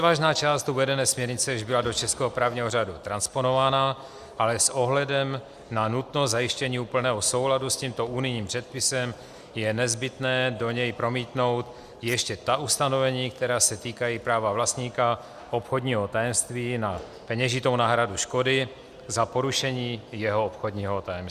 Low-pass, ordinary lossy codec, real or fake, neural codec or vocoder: 14.4 kHz; Opus, 64 kbps; real; none